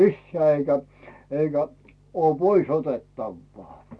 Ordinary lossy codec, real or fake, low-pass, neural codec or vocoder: none; real; none; none